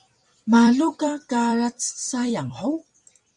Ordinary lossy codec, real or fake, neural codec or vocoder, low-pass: Opus, 64 kbps; fake; vocoder, 44.1 kHz, 128 mel bands every 256 samples, BigVGAN v2; 10.8 kHz